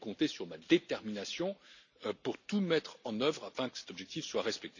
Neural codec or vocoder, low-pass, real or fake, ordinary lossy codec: none; 7.2 kHz; real; none